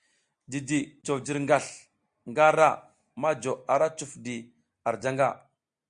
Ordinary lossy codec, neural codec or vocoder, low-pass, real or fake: Opus, 64 kbps; none; 9.9 kHz; real